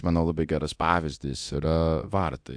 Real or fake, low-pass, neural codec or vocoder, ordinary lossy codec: fake; 9.9 kHz; codec, 24 kHz, 0.9 kbps, DualCodec; AAC, 64 kbps